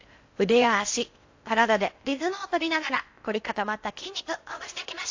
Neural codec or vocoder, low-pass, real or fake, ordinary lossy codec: codec, 16 kHz in and 24 kHz out, 0.6 kbps, FocalCodec, streaming, 4096 codes; 7.2 kHz; fake; AAC, 48 kbps